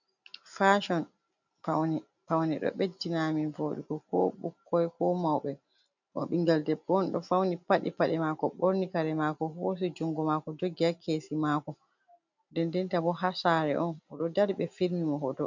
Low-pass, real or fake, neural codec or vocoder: 7.2 kHz; real; none